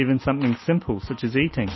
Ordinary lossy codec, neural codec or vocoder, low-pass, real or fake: MP3, 24 kbps; none; 7.2 kHz; real